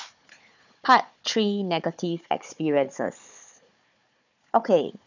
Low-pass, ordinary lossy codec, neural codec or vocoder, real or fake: 7.2 kHz; none; codec, 16 kHz, 4 kbps, FunCodec, trained on Chinese and English, 50 frames a second; fake